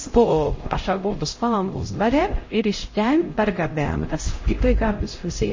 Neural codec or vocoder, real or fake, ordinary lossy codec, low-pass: codec, 16 kHz, 0.5 kbps, X-Codec, HuBERT features, trained on LibriSpeech; fake; MP3, 32 kbps; 7.2 kHz